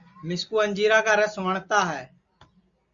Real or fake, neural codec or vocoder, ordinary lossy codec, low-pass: real; none; Opus, 64 kbps; 7.2 kHz